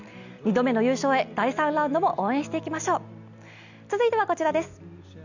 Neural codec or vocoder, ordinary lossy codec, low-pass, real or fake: none; none; 7.2 kHz; real